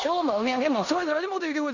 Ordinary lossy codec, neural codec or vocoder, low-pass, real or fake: none; codec, 16 kHz in and 24 kHz out, 0.9 kbps, LongCat-Audio-Codec, four codebook decoder; 7.2 kHz; fake